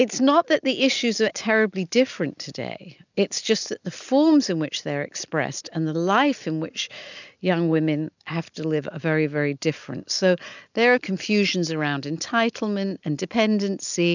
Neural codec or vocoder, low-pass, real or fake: none; 7.2 kHz; real